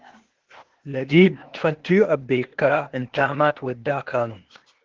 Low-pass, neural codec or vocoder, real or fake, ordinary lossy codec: 7.2 kHz; codec, 16 kHz, 0.8 kbps, ZipCodec; fake; Opus, 16 kbps